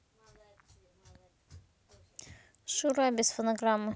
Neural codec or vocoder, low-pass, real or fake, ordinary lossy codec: none; none; real; none